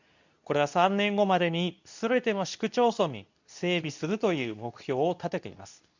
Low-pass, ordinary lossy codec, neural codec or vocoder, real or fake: 7.2 kHz; none; codec, 24 kHz, 0.9 kbps, WavTokenizer, medium speech release version 2; fake